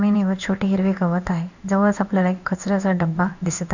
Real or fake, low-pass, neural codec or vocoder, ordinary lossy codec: fake; 7.2 kHz; codec, 16 kHz in and 24 kHz out, 1 kbps, XY-Tokenizer; none